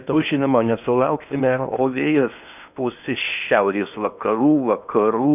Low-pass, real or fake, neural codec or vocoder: 3.6 kHz; fake; codec, 16 kHz in and 24 kHz out, 0.8 kbps, FocalCodec, streaming, 65536 codes